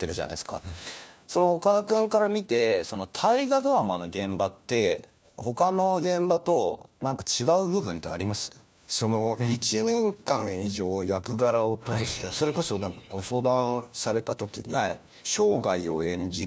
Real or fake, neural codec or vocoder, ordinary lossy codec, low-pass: fake; codec, 16 kHz, 1 kbps, FunCodec, trained on LibriTTS, 50 frames a second; none; none